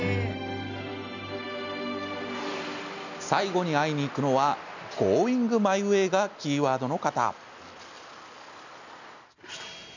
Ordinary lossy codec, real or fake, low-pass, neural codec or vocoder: none; real; 7.2 kHz; none